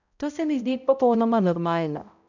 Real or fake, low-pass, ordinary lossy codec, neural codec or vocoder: fake; 7.2 kHz; none; codec, 16 kHz, 0.5 kbps, X-Codec, HuBERT features, trained on balanced general audio